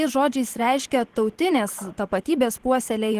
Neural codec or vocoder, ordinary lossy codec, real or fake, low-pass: vocoder, 44.1 kHz, 128 mel bands every 512 samples, BigVGAN v2; Opus, 16 kbps; fake; 14.4 kHz